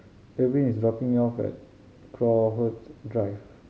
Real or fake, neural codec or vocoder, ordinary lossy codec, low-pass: real; none; none; none